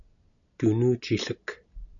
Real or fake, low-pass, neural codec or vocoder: real; 7.2 kHz; none